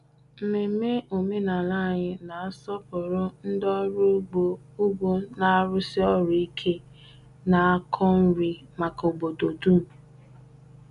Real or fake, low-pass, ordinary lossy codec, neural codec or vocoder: real; 10.8 kHz; none; none